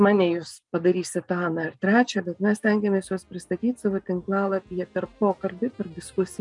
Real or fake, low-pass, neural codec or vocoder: real; 10.8 kHz; none